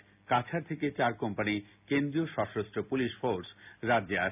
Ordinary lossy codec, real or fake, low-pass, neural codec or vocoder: none; real; 3.6 kHz; none